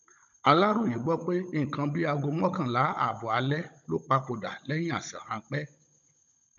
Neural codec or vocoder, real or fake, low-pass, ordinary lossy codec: codec, 16 kHz, 16 kbps, FunCodec, trained on Chinese and English, 50 frames a second; fake; 7.2 kHz; none